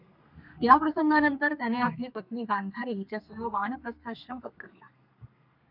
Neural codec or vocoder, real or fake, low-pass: codec, 32 kHz, 1.9 kbps, SNAC; fake; 5.4 kHz